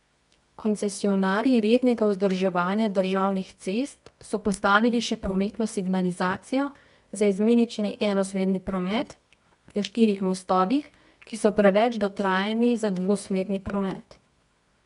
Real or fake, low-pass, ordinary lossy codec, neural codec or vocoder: fake; 10.8 kHz; none; codec, 24 kHz, 0.9 kbps, WavTokenizer, medium music audio release